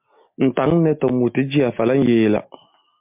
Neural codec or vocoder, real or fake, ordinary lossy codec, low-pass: none; real; MP3, 32 kbps; 3.6 kHz